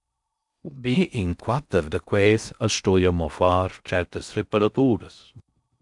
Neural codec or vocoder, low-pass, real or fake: codec, 16 kHz in and 24 kHz out, 0.6 kbps, FocalCodec, streaming, 4096 codes; 10.8 kHz; fake